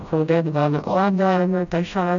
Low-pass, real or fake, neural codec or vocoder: 7.2 kHz; fake; codec, 16 kHz, 0.5 kbps, FreqCodec, smaller model